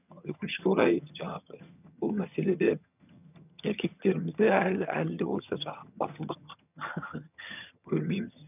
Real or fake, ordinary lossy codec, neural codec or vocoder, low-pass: fake; none; vocoder, 22.05 kHz, 80 mel bands, HiFi-GAN; 3.6 kHz